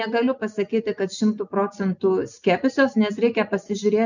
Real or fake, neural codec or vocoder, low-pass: real; none; 7.2 kHz